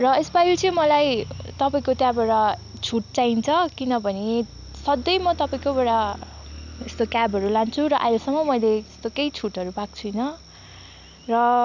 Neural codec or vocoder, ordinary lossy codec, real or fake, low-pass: none; none; real; 7.2 kHz